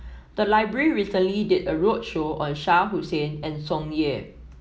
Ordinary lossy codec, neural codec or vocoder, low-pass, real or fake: none; none; none; real